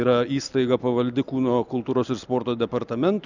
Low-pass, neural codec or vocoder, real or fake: 7.2 kHz; none; real